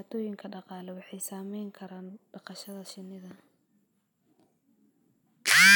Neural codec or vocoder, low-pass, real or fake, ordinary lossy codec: none; none; real; none